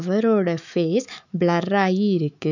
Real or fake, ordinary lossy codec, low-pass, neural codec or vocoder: fake; none; 7.2 kHz; autoencoder, 48 kHz, 128 numbers a frame, DAC-VAE, trained on Japanese speech